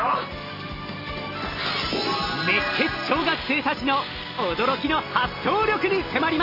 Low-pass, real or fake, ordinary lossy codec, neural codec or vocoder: 5.4 kHz; real; Opus, 32 kbps; none